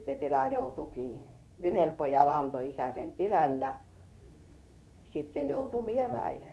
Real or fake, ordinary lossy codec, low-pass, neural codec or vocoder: fake; none; none; codec, 24 kHz, 0.9 kbps, WavTokenizer, medium speech release version 2